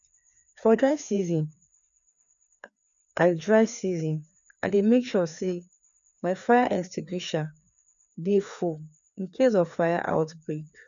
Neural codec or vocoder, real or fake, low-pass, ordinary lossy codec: codec, 16 kHz, 2 kbps, FreqCodec, larger model; fake; 7.2 kHz; none